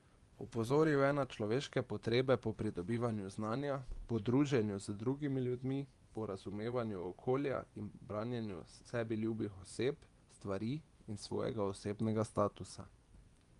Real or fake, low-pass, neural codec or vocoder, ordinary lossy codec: fake; 10.8 kHz; vocoder, 24 kHz, 100 mel bands, Vocos; Opus, 32 kbps